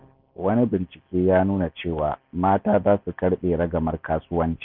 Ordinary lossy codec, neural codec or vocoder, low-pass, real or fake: none; none; 5.4 kHz; real